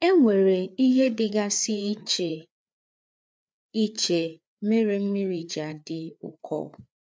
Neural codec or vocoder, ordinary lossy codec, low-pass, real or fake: codec, 16 kHz, 4 kbps, FreqCodec, larger model; none; none; fake